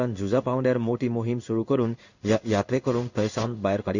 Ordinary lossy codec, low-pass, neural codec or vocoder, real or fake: none; 7.2 kHz; codec, 16 kHz in and 24 kHz out, 1 kbps, XY-Tokenizer; fake